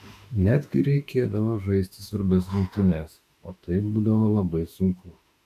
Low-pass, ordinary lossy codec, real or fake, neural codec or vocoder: 14.4 kHz; AAC, 64 kbps; fake; autoencoder, 48 kHz, 32 numbers a frame, DAC-VAE, trained on Japanese speech